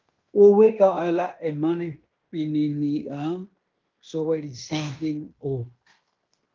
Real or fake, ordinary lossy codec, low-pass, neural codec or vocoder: fake; Opus, 24 kbps; 7.2 kHz; codec, 16 kHz in and 24 kHz out, 0.9 kbps, LongCat-Audio-Codec, fine tuned four codebook decoder